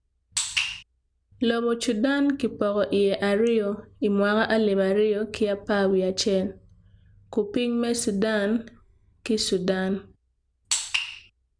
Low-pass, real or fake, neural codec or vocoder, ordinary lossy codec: 9.9 kHz; real; none; none